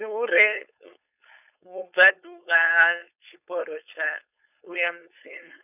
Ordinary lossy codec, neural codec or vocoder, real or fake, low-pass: none; codec, 16 kHz, 4.8 kbps, FACodec; fake; 3.6 kHz